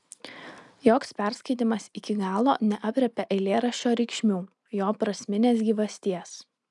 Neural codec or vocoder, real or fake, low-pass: none; real; 10.8 kHz